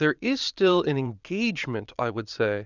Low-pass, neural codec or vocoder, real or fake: 7.2 kHz; vocoder, 22.05 kHz, 80 mel bands, Vocos; fake